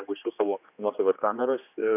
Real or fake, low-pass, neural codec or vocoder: fake; 3.6 kHz; codec, 16 kHz, 2 kbps, X-Codec, HuBERT features, trained on general audio